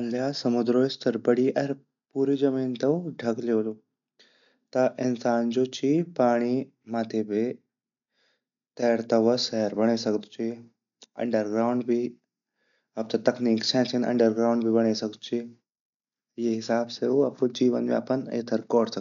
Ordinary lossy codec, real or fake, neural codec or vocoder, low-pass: none; real; none; 7.2 kHz